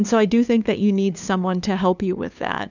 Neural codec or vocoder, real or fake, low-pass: codec, 16 kHz, 2 kbps, FunCodec, trained on LibriTTS, 25 frames a second; fake; 7.2 kHz